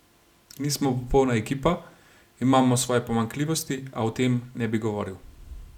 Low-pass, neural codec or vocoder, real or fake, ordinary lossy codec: 19.8 kHz; none; real; none